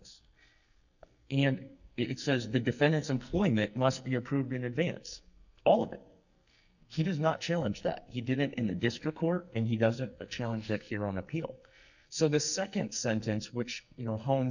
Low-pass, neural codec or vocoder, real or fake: 7.2 kHz; codec, 44.1 kHz, 2.6 kbps, SNAC; fake